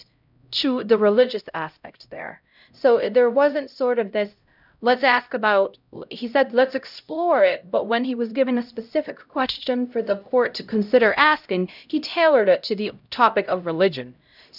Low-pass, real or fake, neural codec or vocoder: 5.4 kHz; fake; codec, 16 kHz, 0.5 kbps, X-Codec, HuBERT features, trained on LibriSpeech